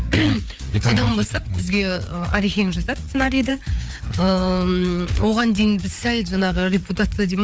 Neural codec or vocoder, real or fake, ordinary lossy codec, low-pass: codec, 16 kHz, 4 kbps, FreqCodec, larger model; fake; none; none